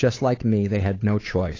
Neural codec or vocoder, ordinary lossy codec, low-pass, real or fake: codec, 16 kHz, 8 kbps, FunCodec, trained on Chinese and English, 25 frames a second; AAC, 32 kbps; 7.2 kHz; fake